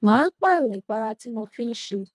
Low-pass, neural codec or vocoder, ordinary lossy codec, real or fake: none; codec, 24 kHz, 1.5 kbps, HILCodec; none; fake